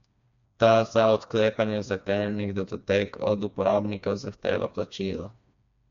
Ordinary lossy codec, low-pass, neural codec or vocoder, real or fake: MP3, 64 kbps; 7.2 kHz; codec, 16 kHz, 2 kbps, FreqCodec, smaller model; fake